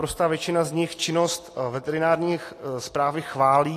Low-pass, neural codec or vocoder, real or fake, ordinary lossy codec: 14.4 kHz; none; real; AAC, 48 kbps